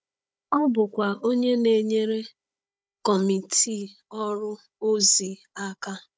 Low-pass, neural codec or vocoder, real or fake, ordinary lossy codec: none; codec, 16 kHz, 16 kbps, FunCodec, trained on Chinese and English, 50 frames a second; fake; none